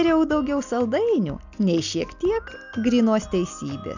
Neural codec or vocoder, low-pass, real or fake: none; 7.2 kHz; real